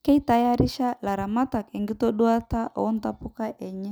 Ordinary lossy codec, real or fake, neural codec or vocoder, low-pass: none; real; none; none